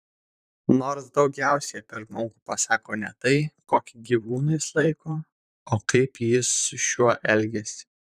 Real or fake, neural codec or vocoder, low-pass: fake; vocoder, 44.1 kHz, 128 mel bands, Pupu-Vocoder; 14.4 kHz